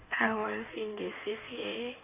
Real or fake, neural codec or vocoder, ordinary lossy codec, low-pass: fake; codec, 16 kHz in and 24 kHz out, 1.1 kbps, FireRedTTS-2 codec; none; 3.6 kHz